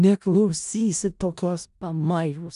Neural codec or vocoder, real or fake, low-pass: codec, 16 kHz in and 24 kHz out, 0.4 kbps, LongCat-Audio-Codec, four codebook decoder; fake; 10.8 kHz